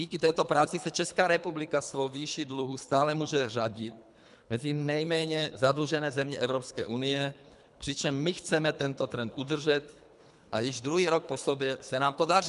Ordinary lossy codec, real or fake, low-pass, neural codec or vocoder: MP3, 96 kbps; fake; 10.8 kHz; codec, 24 kHz, 3 kbps, HILCodec